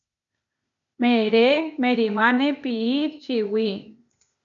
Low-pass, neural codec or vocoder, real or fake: 7.2 kHz; codec, 16 kHz, 0.8 kbps, ZipCodec; fake